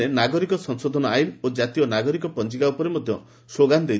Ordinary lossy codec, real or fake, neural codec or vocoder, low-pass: none; real; none; none